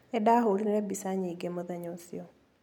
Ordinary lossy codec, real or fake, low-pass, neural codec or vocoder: none; real; 19.8 kHz; none